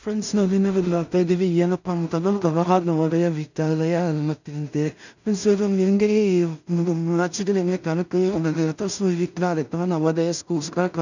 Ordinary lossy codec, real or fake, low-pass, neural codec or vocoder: none; fake; 7.2 kHz; codec, 16 kHz in and 24 kHz out, 0.4 kbps, LongCat-Audio-Codec, two codebook decoder